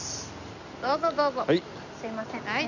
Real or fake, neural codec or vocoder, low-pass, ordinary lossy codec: real; none; 7.2 kHz; none